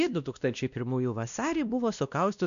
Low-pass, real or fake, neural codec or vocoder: 7.2 kHz; fake; codec, 16 kHz, 1 kbps, X-Codec, WavLM features, trained on Multilingual LibriSpeech